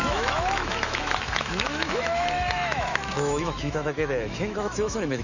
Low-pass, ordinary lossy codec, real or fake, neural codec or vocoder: 7.2 kHz; none; fake; autoencoder, 48 kHz, 128 numbers a frame, DAC-VAE, trained on Japanese speech